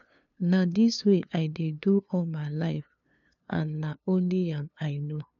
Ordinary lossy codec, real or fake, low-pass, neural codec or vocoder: none; fake; 7.2 kHz; codec, 16 kHz, 2 kbps, FunCodec, trained on LibriTTS, 25 frames a second